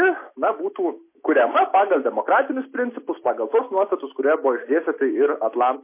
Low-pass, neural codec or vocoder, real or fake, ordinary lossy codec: 3.6 kHz; none; real; MP3, 24 kbps